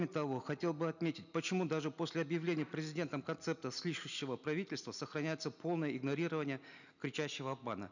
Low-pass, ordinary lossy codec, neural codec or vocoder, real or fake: 7.2 kHz; none; none; real